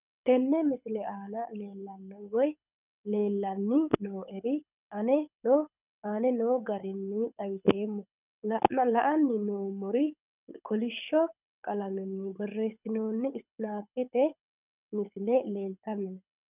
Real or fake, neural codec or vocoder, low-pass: fake; codec, 24 kHz, 6 kbps, HILCodec; 3.6 kHz